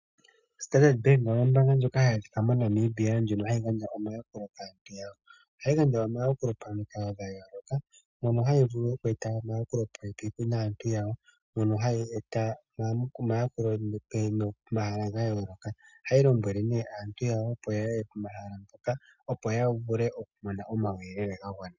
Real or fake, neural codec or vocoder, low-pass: real; none; 7.2 kHz